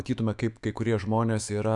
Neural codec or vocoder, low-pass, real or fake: autoencoder, 48 kHz, 128 numbers a frame, DAC-VAE, trained on Japanese speech; 10.8 kHz; fake